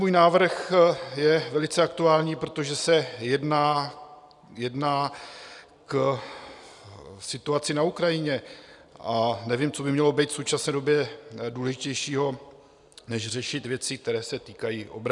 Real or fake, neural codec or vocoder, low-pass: real; none; 10.8 kHz